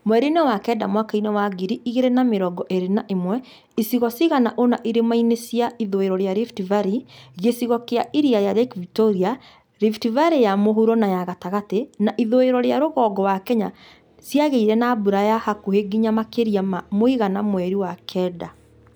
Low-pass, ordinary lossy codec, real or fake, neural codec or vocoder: none; none; real; none